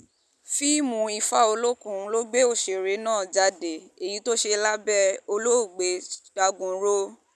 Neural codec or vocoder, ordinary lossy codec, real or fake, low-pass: none; none; real; none